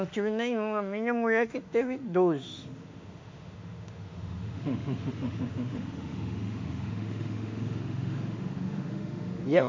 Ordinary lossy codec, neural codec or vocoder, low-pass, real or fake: MP3, 48 kbps; autoencoder, 48 kHz, 32 numbers a frame, DAC-VAE, trained on Japanese speech; 7.2 kHz; fake